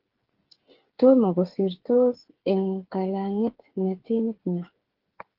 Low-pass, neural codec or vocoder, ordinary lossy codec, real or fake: 5.4 kHz; codec, 16 kHz, 8 kbps, FreqCodec, smaller model; Opus, 16 kbps; fake